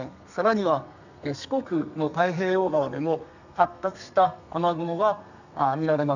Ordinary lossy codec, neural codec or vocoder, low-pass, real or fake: none; codec, 32 kHz, 1.9 kbps, SNAC; 7.2 kHz; fake